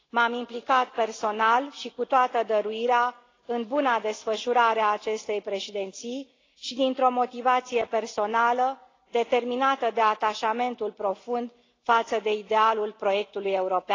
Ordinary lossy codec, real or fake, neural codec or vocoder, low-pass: AAC, 32 kbps; real; none; 7.2 kHz